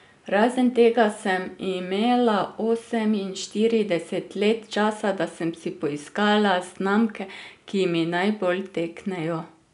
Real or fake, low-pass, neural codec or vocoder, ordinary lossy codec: real; 10.8 kHz; none; none